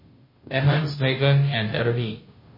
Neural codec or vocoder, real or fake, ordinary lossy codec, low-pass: codec, 16 kHz, 0.5 kbps, FunCodec, trained on Chinese and English, 25 frames a second; fake; MP3, 24 kbps; 5.4 kHz